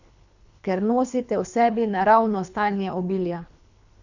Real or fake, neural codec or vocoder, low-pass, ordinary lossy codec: fake; codec, 24 kHz, 3 kbps, HILCodec; 7.2 kHz; none